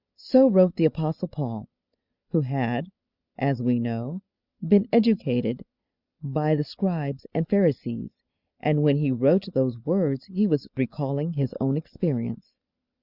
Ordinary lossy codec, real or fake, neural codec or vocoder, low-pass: Opus, 64 kbps; real; none; 5.4 kHz